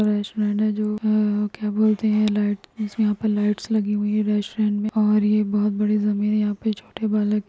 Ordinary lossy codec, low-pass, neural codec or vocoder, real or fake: none; none; none; real